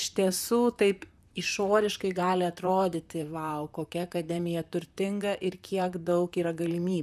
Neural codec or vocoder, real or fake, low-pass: vocoder, 44.1 kHz, 128 mel bands, Pupu-Vocoder; fake; 14.4 kHz